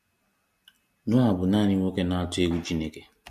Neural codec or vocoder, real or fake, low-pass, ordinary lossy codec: none; real; 14.4 kHz; MP3, 64 kbps